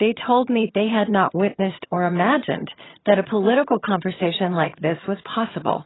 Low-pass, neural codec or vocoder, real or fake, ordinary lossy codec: 7.2 kHz; vocoder, 22.05 kHz, 80 mel bands, HiFi-GAN; fake; AAC, 16 kbps